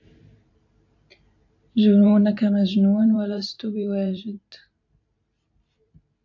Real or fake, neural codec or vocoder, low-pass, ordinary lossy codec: fake; vocoder, 44.1 kHz, 128 mel bands every 512 samples, BigVGAN v2; 7.2 kHz; AAC, 48 kbps